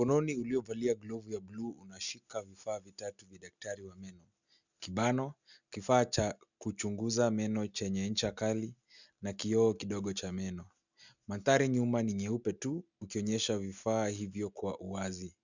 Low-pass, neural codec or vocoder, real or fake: 7.2 kHz; none; real